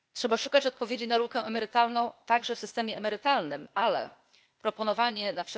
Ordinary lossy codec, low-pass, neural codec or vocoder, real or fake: none; none; codec, 16 kHz, 0.8 kbps, ZipCodec; fake